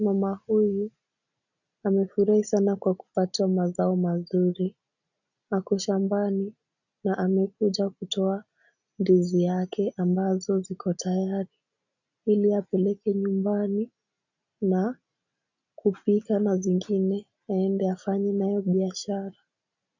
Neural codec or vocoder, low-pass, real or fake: none; 7.2 kHz; real